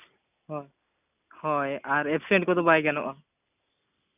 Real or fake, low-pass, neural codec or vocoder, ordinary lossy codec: real; 3.6 kHz; none; none